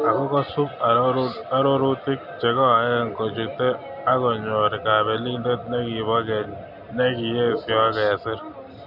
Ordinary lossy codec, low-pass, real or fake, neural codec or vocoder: none; 5.4 kHz; real; none